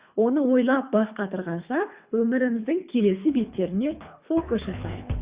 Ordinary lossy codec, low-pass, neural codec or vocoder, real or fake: Opus, 64 kbps; 3.6 kHz; codec, 24 kHz, 3 kbps, HILCodec; fake